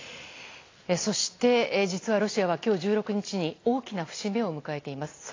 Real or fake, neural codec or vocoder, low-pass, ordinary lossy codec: real; none; 7.2 kHz; AAC, 32 kbps